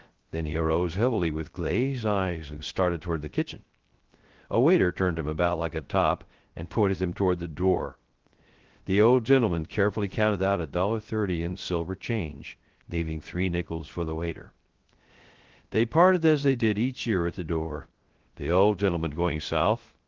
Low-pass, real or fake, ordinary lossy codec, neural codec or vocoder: 7.2 kHz; fake; Opus, 16 kbps; codec, 16 kHz, 0.3 kbps, FocalCodec